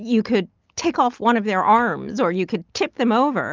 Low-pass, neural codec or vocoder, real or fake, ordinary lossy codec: 7.2 kHz; none; real; Opus, 24 kbps